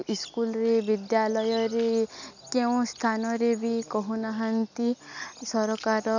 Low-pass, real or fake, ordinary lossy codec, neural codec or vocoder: 7.2 kHz; real; none; none